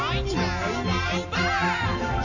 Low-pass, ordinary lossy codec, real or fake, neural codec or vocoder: 7.2 kHz; none; real; none